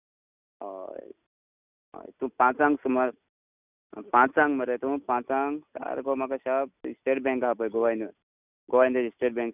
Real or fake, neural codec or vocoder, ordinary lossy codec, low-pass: real; none; none; 3.6 kHz